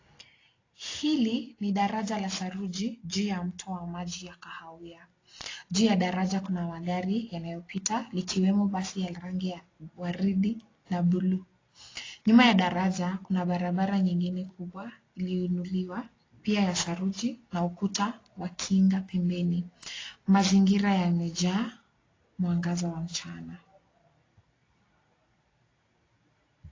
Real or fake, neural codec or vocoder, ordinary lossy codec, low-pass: real; none; AAC, 32 kbps; 7.2 kHz